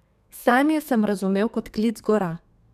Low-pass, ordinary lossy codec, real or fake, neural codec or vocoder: 14.4 kHz; none; fake; codec, 32 kHz, 1.9 kbps, SNAC